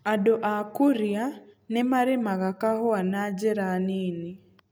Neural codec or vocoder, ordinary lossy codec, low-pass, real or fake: none; none; none; real